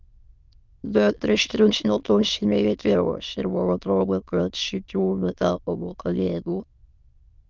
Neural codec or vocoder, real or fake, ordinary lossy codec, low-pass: autoencoder, 22.05 kHz, a latent of 192 numbers a frame, VITS, trained on many speakers; fake; Opus, 24 kbps; 7.2 kHz